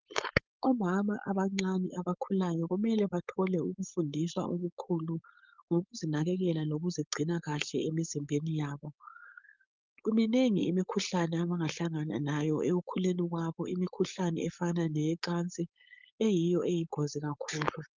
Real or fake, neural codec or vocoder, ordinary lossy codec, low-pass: fake; codec, 16 kHz, 4.8 kbps, FACodec; Opus, 32 kbps; 7.2 kHz